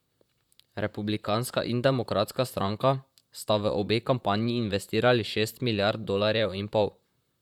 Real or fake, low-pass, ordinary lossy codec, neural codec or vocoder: fake; 19.8 kHz; none; vocoder, 44.1 kHz, 128 mel bands, Pupu-Vocoder